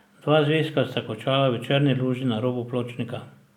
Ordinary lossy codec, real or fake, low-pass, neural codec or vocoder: none; real; 19.8 kHz; none